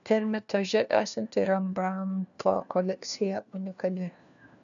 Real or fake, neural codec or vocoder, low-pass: fake; codec, 16 kHz, 1 kbps, FunCodec, trained on LibriTTS, 50 frames a second; 7.2 kHz